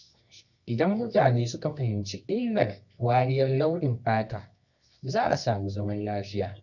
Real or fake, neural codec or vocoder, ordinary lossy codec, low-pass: fake; codec, 24 kHz, 0.9 kbps, WavTokenizer, medium music audio release; none; 7.2 kHz